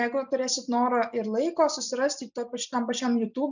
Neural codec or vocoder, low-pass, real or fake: none; 7.2 kHz; real